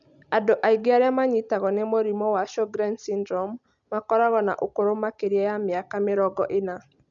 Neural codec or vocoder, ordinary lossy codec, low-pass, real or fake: none; none; 7.2 kHz; real